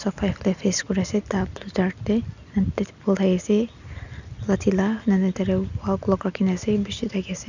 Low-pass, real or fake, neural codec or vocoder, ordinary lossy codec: 7.2 kHz; real; none; none